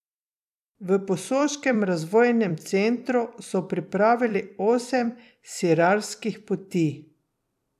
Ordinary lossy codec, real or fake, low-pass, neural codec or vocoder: none; fake; 14.4 kHz; vocoder, 44.1 kHz, 128 mel bands every 256 samples, BigVGAN v2